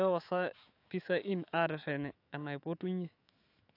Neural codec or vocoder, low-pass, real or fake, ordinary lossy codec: autoencoder, 48 kHz, 128 numbers a frame, DAC-VAE, trained on Japanese speech; 5.4 kHz; fake; AAC, 32 kbps